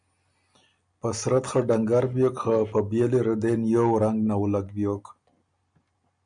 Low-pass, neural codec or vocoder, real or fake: 9.9 kHz; none; real